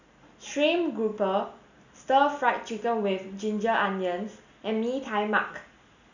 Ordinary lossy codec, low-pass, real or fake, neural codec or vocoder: none; 7.2 kHz; real; none